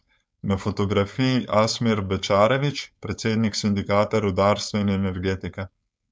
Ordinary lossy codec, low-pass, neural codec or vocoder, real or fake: none; none; codec, 16 kHz, 4.8 kbps, FACodec; fake